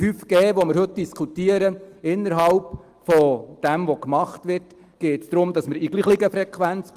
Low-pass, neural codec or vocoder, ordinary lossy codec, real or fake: 14.4 kHz; none; Opus, 24 kbps; real